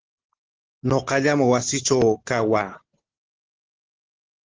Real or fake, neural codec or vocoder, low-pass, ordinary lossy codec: real; none; 7.2 kHz; Opus, 16 kbps